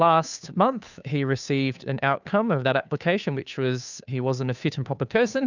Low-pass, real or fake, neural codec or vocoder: 7.2 kHz; fake; codec, 16 kHz, 2 kbps, FunCodec, trained on Chinese and English, 25 frames a second